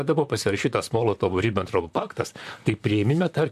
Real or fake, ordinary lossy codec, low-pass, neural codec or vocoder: fake; MP3, 96 kbps; 14.4 kHz; vocoder, 44.1 kHz, 128 mel bands, Pupu-Vocoder